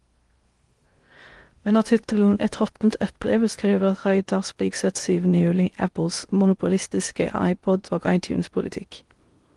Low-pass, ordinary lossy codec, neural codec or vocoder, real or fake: 10.8 kHz; Opus, 24 kbps; codec, 16 kHz in and 24 kHz out, 0.6 kbps, FocalCodec, streaming, 2048 codes; fake